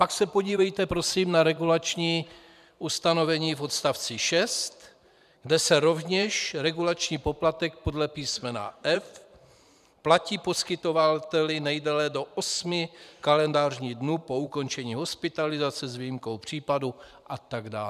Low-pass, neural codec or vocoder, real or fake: 14.4 kHz; vocoder, 44.1 kHz, 128 mel bands every 512 samples, BigVGAN v2; fake